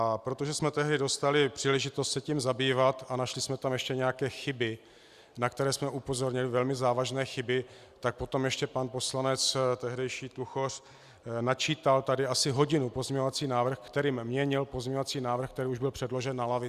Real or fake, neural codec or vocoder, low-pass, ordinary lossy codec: real; none; 14.4 kHz; Opus, 64 kbps